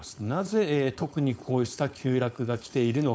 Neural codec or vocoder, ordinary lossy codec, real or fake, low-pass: codec, 16 kHz, 4.8 kbps, FACodec; none; fake; none